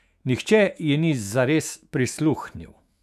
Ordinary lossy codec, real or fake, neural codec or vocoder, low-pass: none; fake; autoencoder, 48 kHz, 128 numbers a frame, DAC-VAE, trained on Japanese speech; 14.4 kHz